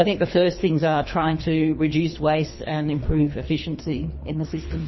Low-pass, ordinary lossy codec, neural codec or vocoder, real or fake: 7.2 kHz; MP3, 24 kbps; codec, 24 kHz, 3 kbps, HILCodec; fake